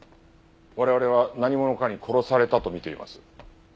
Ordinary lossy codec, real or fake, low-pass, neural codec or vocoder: none; real; none; none